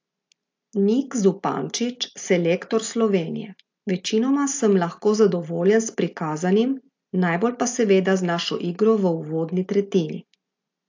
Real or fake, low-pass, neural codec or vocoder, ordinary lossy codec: real; 7.2 kHz; none; AAC, 48 kbps